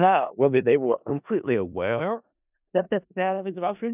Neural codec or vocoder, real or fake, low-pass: codec, 16 kHz in and 24 kHz out, 0.4 kbps, LongCat-Audio-Codec, four codebook decoder; fake; 3.6 kHz